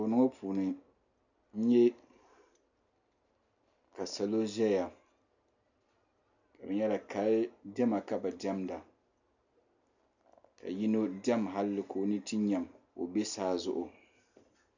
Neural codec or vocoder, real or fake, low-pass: none; real; 7.2 kHz